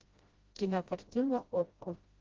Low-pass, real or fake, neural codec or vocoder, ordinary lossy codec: 7.2 kHz; fake; codec, 16 kHz, 0.5 kbps, FreqCodec, smaller model; Opus, 32 kbps